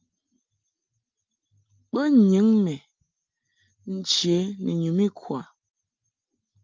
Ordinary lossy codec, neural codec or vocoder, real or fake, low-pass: Opus, 24 kbps; none; real; 7.2 kHz